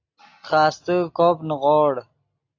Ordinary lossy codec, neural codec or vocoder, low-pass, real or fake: AAC, 48 kbps; none; 7.2 kHz; real